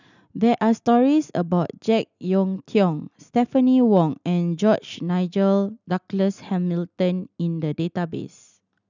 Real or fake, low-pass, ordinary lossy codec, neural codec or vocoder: real; 7.2 kHz; none; none